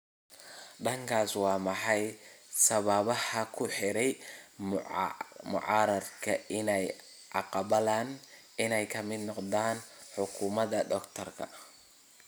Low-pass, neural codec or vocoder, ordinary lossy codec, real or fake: none; none; none; real